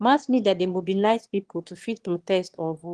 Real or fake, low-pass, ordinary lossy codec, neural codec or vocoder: fake; 9.9 kHz; Opus, 16 kbps; autoencoder, 22.05 kHz, a latent of 192 numbers a frame, VITS, trained on one speaker